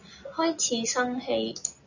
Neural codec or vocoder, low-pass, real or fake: none; 7.2 kHz; real